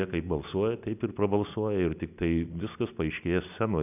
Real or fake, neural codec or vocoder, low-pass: real; none; 3.6 kHz